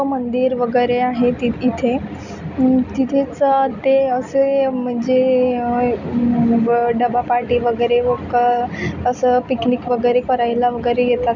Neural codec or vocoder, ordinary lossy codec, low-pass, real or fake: none; none; 7.2 kHz; real